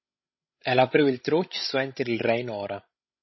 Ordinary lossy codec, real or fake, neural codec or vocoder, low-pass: MP3, 24 kbps; fake; codec, 16 kHz, 16 kbps, FreqCodec, larger model; 7.2 kHz